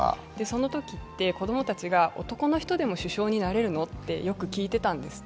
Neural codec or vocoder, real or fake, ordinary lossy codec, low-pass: none; real; none; none